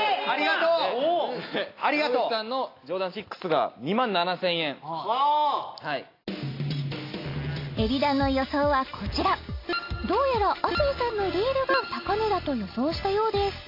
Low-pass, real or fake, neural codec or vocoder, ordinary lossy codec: 5.4 kHz; real; none; AAC, 32 kbps